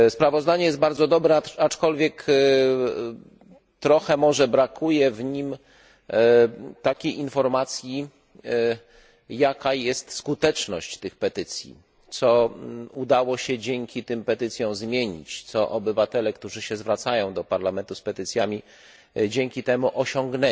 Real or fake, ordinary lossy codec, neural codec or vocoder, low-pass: real; none; none; none